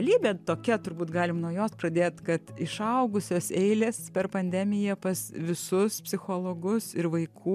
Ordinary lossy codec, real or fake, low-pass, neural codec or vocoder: MP3, 96 kbps; real; 14.4 kHz; none